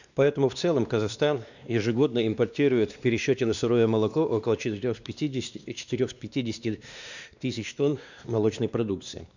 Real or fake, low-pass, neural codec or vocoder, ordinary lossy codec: fake; 7.2 kHz; codec, 16 kHz, 2 kbps, X-Codec, WavLM features, trained on Multilingual LibriSpeech; none